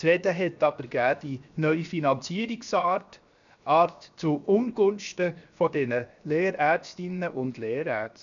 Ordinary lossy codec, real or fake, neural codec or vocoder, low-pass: none; fake; codec, 16 kHz, 0.7 kbps, FocalCodec; 7.2 kHz